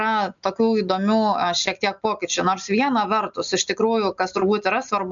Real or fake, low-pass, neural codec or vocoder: real; 7.2 kHz; none